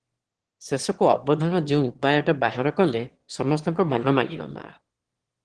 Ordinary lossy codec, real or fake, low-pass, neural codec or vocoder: Opus, 16 kbps; fake; 9.9 kHz; autoencoder, 22.05 kHz, a latent of 192 numbers a frame, VITS, trained on one speaker